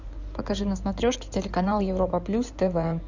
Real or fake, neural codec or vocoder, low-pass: fake; codec, 16 kHz in and 24 kHz out, 2.2 kbps, FireRedTTS-2 codec; 7.2 kHz